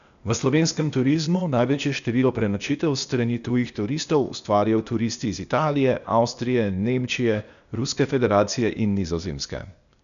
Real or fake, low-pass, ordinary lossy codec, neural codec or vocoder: fake; 7.2 kHz; none; codec, 16 kHz, 0.8 kbps, ZipCodec